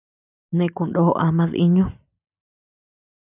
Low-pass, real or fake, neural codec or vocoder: 3.6 kHz; real; none